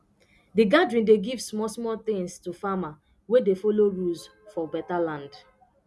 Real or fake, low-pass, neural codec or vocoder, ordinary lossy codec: real; none; none; none